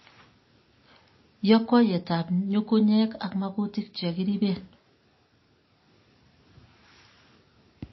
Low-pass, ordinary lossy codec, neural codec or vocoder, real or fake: 7.2 kHz; MP3, 24 kbps; none; real